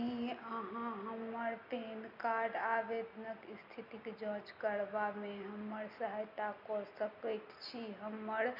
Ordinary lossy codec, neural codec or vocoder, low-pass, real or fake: none; none; 5.4 kHz; real